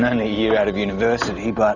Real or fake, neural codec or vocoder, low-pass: real; none; 7.2 kHz